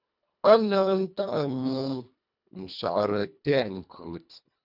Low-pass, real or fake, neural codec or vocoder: 5.4 kHz; fake; codec, 24 kHz, 1.5 kbps, HILCodec